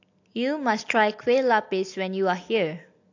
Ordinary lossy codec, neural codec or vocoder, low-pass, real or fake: AAC, 48 kbps; none; 7.2 kHz; real